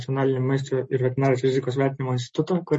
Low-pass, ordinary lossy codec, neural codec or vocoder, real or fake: 10.8 kHz; MP3, 32 kbps; none; real